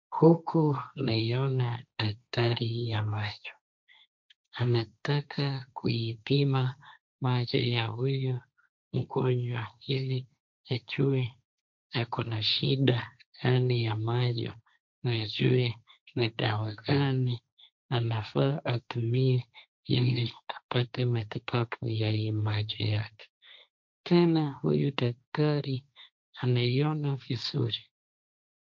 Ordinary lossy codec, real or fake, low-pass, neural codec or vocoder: MP3, 64 kbps; fake; 7.2 kHz; codec, 16 kHz, 1.1 kbps, Voila-Tokenizer